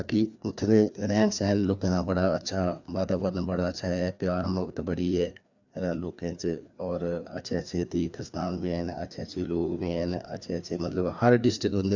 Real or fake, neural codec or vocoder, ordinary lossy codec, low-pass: fake; codec, 16 kHz, 2 kbps, FreqCodec, larger model; none; 7.2 kHz